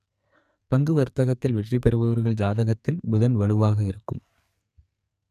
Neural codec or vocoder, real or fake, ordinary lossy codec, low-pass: codec, 44.1 kHz, 2.6 kbps, SNAC; fake; none; 14.4 kHz